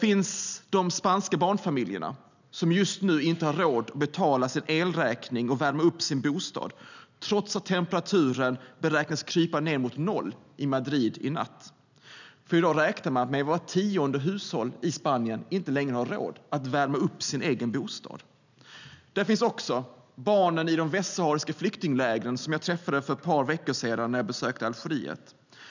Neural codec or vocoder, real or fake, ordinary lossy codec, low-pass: none; real; none; 7.2 kHz